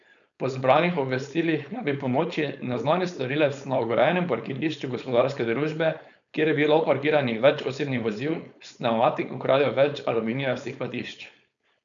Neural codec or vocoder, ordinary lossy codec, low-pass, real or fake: codec, 16 kHz, 4.8 kbps, FACodec; none; 7.2 kHz; fake